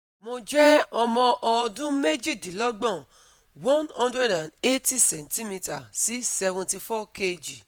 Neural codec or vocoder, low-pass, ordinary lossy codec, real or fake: vocoder, 48 kHz, 128 mel bands, Vocos; none; none; fake